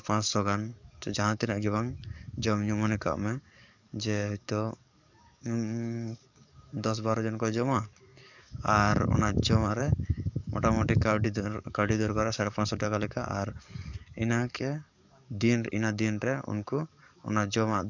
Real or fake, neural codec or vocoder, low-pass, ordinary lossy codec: fake; codec, 44.1 kHz, 7.8 kbps, DAC; 7.2 kHz; none